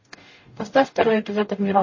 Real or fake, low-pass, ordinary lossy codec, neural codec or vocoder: fake; 7.2 kHz; MP3, 32 kbps; codec, 44.1 kHz, 0.9 kbps, DAC